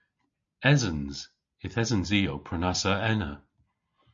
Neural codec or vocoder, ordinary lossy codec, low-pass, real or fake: none; MP3, 48 kbps; 7.2 kHz; real